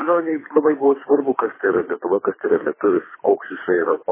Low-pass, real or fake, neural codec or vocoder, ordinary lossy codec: 3.6 kHz; fake; codec, 24 kHz, 3 kbps, HILCodec; MP3, 16 kbps